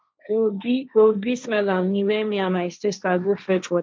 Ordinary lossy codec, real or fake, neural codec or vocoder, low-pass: none; fake; codec, 16 kHz, 1.1 kbps, Voila-Tokenizer; none